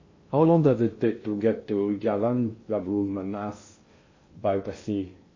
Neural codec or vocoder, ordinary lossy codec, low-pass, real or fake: codec, 16 kHz in and 24 kHz out, 0.6 kbps, FocalCodec, streaming, 2048 codes; MP3, 32 kbps; 7.2 kHz; fake